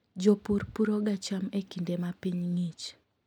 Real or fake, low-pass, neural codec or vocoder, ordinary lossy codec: real; 19.8 kHz; none; none